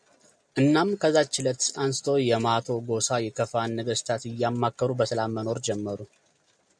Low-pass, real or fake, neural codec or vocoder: 9.9 kHz; real; none